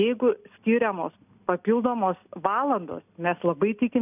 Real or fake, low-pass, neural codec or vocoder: real; 3.6 kHz; none